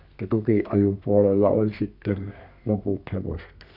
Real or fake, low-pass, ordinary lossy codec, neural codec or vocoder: fake; 5.4 kHz; none; codec, 32 kHz, 1.9 kbps, SNAC